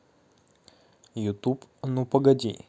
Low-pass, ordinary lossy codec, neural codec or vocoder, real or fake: none; none; none; real